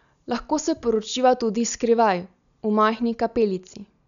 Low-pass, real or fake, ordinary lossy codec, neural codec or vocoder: 7.2 kHz; real; none; none